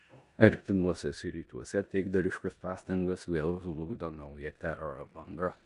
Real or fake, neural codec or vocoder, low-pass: fake; codec, 16 kHz in and 24 kHz out, 0.9 kbps, LongCat-Audio-Codec, four codebook decoder; 10.8 kHz